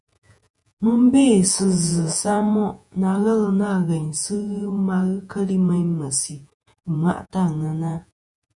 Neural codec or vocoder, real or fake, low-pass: vocoder, 48 kHz, 128 mel bands, Vocos; fake; 10.8 kHz